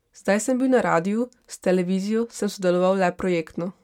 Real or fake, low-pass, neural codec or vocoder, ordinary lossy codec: real; 19.8 kHz; none; MP3, 96 kbps